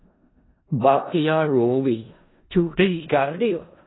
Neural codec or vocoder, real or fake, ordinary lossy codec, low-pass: codec, 16 kHz in and 24 kHz out, 0.4 kbps, LongCat-Audio-Codec, four codebook decoder; fake; AAC, 16 kbps; 7.2 kHz